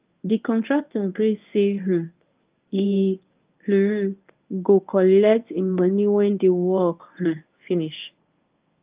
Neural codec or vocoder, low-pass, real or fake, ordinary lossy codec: codec, 24 kHz, 0.9 kbps, WavTokenizer, medium speech release version 1; 3.6 kHz; fake; Opus, 24 kbps